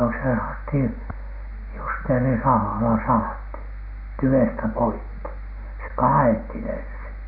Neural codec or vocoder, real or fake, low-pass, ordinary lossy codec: none; real; 5.4 kHz; none